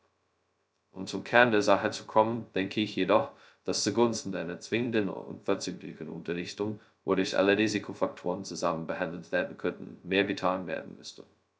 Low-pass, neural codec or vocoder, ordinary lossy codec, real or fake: none; codec, 16 kHz, 0.2 kbps, FocalCodec; none; fake